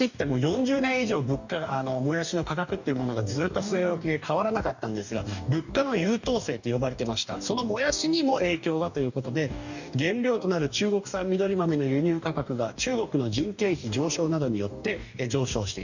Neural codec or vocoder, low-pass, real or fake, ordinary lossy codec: codec, 44.1 kHz, 2.6 kbps, DAC; 7.2 kHz; fake; none